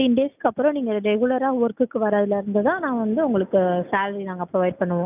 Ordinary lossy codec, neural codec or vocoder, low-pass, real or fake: none; none; 3.6 kHz; real